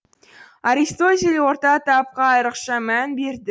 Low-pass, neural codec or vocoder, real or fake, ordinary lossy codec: none; none; real; none